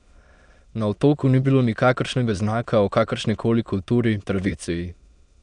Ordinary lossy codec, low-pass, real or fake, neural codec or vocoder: none; 9.9 kHz; fake; autoencoder, 22.05 kHz, a latent of 192 numbers a frame, VITS, trained on many speakers